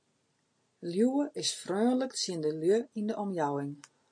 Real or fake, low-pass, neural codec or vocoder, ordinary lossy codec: real; 9.9 kHz; none; MP3, 48 kbps